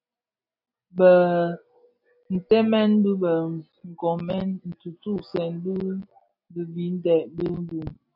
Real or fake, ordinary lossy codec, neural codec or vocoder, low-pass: real; AAC, 48 kbps; none; 5.4 kHz